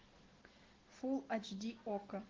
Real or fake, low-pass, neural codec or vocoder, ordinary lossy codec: real; 7.2 kHz; none; Opus, 16 kbps